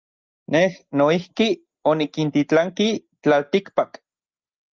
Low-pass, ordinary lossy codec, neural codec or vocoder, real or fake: 7.2 kHz; Opus, 32 kbps; none; real